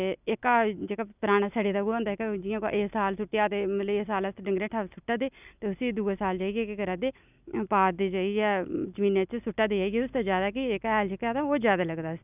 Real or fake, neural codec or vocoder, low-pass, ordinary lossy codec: real; none; 3.6 kHz; none